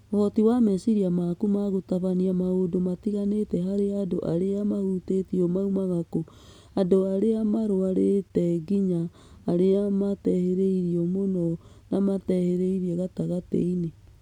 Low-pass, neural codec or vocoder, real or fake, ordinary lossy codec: 19.8 kHz; none; real; none